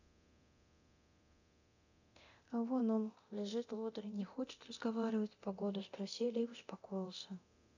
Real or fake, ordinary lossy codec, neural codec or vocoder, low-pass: fake; none; codec, 24 kHz, 0.9 kbps, DualCodec; 7.2 kHz